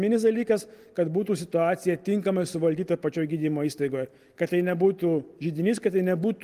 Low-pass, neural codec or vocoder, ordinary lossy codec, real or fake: 14.4 kHz; none; Opus, 24 kbps; real